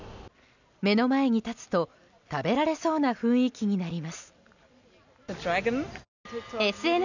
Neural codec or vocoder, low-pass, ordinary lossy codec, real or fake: none; 7.2 kHz; none; real